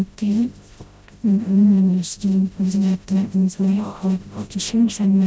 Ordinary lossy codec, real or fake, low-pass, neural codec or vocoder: none; fake; none; codec, 16 kHz, 0.5 kbps, FreqCodec, smaller model